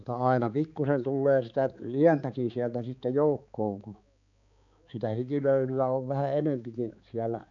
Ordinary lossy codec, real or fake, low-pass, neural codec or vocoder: none; fake; 7.2 kHz; codec, 16 kHz, 4 kbps, X-Codec, HuBERT features, trained on balanced general audio